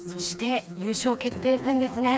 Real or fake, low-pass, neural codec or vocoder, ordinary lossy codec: fake; none; codec, 16 kHz, 2 kbps, FreqCodec, smaller model; none